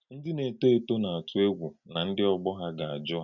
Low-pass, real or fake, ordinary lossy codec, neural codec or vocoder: 7.2 kHz; real; none; none